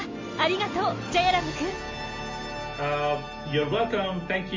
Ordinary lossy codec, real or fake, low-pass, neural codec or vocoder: MP3, 32 kbps; real; 7.2 kHz; none